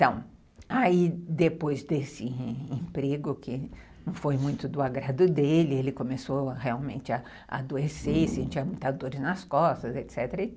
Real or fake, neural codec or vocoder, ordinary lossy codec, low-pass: real; none; none; none